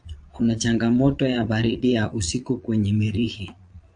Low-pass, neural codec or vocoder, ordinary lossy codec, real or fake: 9.9 kHz; vocoder, 22.05 kHz, 80 mel bands, Vocos; AAC, 64 kbps; fake